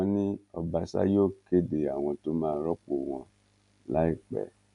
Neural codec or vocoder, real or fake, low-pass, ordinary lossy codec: none; real; 10.8 kHz; MP3, 96 kbps